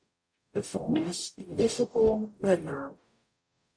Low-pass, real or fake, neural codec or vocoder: 9.9 kHz; fake; codec, 44.1 kHz, 0.9 kbps, DAC